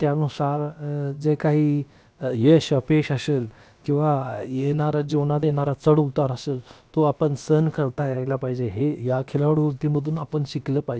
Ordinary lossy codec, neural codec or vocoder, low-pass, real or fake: none; codec, 16 kHz, about 1 kbps, DyCAST, with the encoder's durations; none; fake